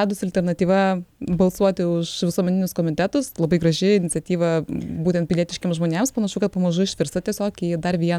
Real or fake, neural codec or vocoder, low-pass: real; none; 19.8 kHz